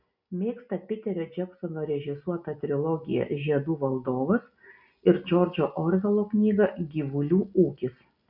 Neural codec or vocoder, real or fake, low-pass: none; real; 5.4 kHz